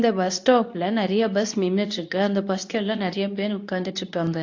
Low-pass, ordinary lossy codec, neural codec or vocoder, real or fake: 7.2 kHz; AAC, 48 kbps; codec, 24 kHz, 0.9 kbps, WavTokenizer, medium speech release version 2; fake